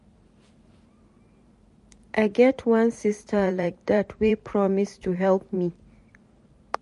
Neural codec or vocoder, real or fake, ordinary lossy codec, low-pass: vocoder, 44.1 kHz, 128 mel bands every 512 samples, BigVGAN v2; fake; MP3, 48 kbps; 14.4 kHz